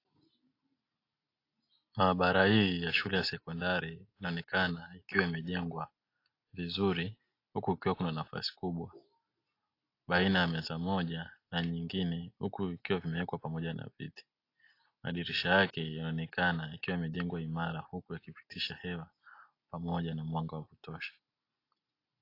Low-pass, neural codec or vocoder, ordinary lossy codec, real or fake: 5.4 kHz; none; AAC, 32 kbps; real